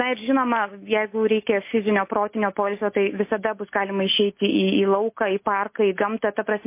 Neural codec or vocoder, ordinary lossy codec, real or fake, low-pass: none; MP3, 24 kbps; real; 3.6 kHz